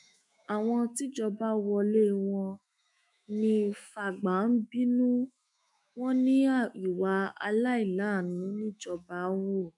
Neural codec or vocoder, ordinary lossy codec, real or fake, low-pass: autoencoder, 48 kHz, 128 numbers a frame, DAC-VAE, trained on Japanese speech; none; fake; 10.8 kHz